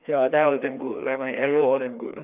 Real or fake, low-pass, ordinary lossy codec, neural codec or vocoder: fake; 3.6 kHz; none; codec, 16 kHz, 2 kbps, FreqCodec, larger model